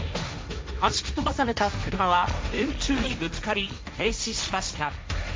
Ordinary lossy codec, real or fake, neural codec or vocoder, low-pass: none; fake; codec, 16 kHz, 1.1 kbps, Voila-Tokenizer; none